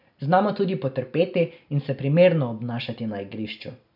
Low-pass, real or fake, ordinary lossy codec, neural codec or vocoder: 5.4 kHz; real; none; none